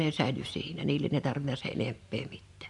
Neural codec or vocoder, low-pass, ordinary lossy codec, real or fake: vocoder, 44.1 kHz, 128 mel bands every 512 samples, BigVGAN v2; 10.8 kHz; none; fake